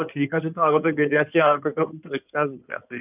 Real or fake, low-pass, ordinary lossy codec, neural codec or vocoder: fake; 3.6 kHz; none; codec, 16 kHz in and 24 kHz out, 2.2 kbps, FireRedTTS-2 codec